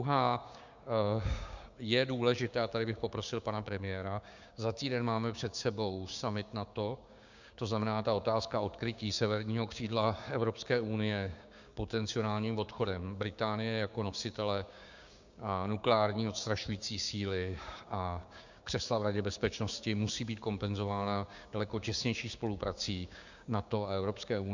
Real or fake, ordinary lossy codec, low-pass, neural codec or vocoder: fake; Opus, 64 kbps; 7.2 kHz; codec, 16 kHz, 6 kbps, DAC